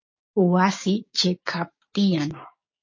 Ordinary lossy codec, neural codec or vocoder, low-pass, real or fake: MP3, 32 kbps; codec, 16 kHz, 6 kbps, DAC; 7.2 kHz; fake